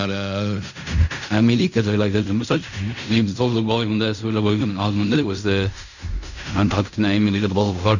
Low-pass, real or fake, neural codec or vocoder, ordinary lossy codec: 7.2 kHz; fake; codec, 16 kHz in and 24 kHz out, 0.4 kbps, LongCat-Audio-Codec, fine tuned four codebook decoder; none